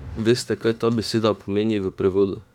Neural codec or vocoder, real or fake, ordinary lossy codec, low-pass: autoencoder, 48 kHz, 32 numbers a frame, DAC-VAE, trained on Japanese speech; fake; none; 19.8 kHz